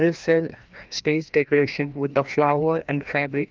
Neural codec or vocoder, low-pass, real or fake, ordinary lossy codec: codec, 16 kHz, 1 kbps, FreqCodec, larger model; 7.2 kHz; fake; Opus, 32 kbps